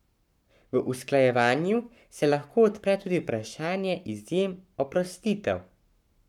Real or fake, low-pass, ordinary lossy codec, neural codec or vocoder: fake; 19.8 kHz; none; codec, 44.1 kHz, 7.8 kbps, Pupu-Codec